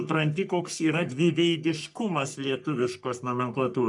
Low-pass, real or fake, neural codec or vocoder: 10.8 kHz; fake; codec, 44.1 kHz, 3.4 kbps, Pupu-Codec